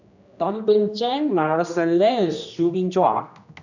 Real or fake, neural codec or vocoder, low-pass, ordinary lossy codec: fake; codec, 16 kHz, 1 kbps, X-Codec, HuBERT features, trained on general audio; 7.2 kHz; none